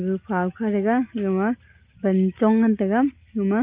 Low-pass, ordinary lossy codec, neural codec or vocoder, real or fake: 3.6 kHz; Opus, 32 kbps; none; real